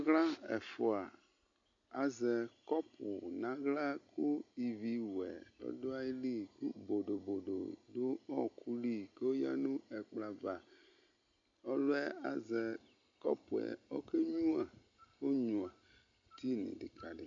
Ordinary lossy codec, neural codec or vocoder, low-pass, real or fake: AAC, 48 kbps; none; 7.2 kHz; real